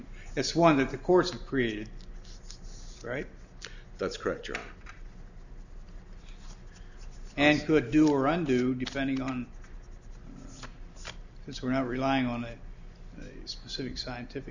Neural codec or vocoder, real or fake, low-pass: none; real; 7.2 kHz